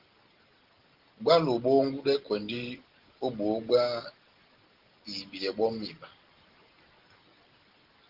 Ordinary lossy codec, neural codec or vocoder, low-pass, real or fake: Opus, 16 kbps; none; 5.4 kHz; real